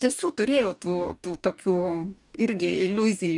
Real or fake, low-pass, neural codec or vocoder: fake; 10.8 kHz; codec, 44.1 kHz, 2.6 kbps, DAC